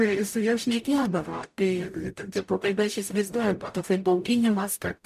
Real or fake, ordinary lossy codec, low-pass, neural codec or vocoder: fake; MP3, 96 kbps; 14.4 kHz; codec, 44.1 kHz, 0.9 kbps, DAC